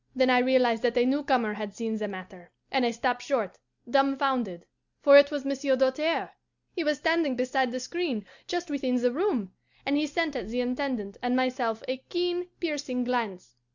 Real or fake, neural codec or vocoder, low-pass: real; none; 7.2 kHz